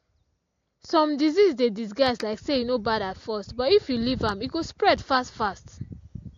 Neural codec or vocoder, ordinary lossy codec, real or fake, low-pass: none; AAC, 48 kbps; real; 7.2 kHz